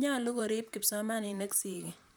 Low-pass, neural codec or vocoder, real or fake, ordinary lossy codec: none; vocoder, 44.1 kHz, 128 mel bands, Pupu-Vocoder; fake; none